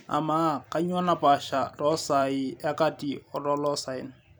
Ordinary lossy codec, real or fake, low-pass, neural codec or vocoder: none; fake; none; vocoder, 44.1 kHz, 128 mel bands every 256 samples, BigVGAN v2